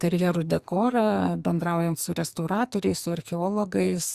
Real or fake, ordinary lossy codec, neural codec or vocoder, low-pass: fake; Opus, 64 kbps; codec, 44.1 kHz, 2.6 kbps, SNAC; 14.4 kHz